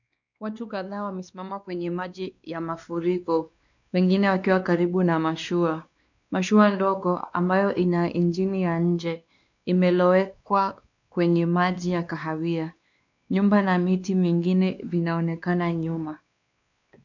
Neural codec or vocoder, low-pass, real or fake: codec, 16 kHz, 2 kbps, X-Codec, WavLM features, trained on Multilingual LibriSpeech; 7.2 kHz; fake